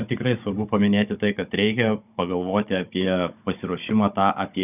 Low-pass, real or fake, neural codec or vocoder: 3.6 kHz; fake; codec, 16 kHz, 16 kbps, FunCodec, trained on Chinese and English, 50 frames a second